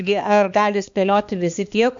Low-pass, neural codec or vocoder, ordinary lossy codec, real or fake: 7.2 kHz; codec, 16 kHz, 2 kbps, X-Codec, HuBERT features, trained on balanced general audio; MP3, 64 kbps; fake